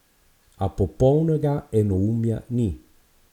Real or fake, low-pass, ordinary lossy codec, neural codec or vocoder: real; 19.8 kHz; none; none